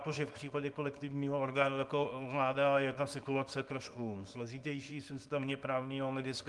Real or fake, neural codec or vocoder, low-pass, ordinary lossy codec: fake; codec, 24 kHz, 0.9 kbps, WavTokenizer, small release; 10.8 kHz; Opus, 24 kbps